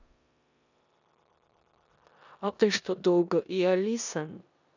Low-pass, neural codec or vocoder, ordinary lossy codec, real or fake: 7.2 kHz; codec, 16 kHz in and 24 kHz out, 0.9 kbps, LongCat-Audio-Codec, four codebook decoder; none; fake